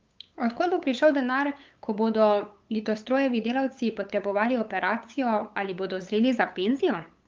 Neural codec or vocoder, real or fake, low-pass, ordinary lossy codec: codec, 16 kHz, 8 kbps, FunCodec, trained on LibriTTS, 25 frames a second; fake; 7.2 kHz; Opus, 32 kbps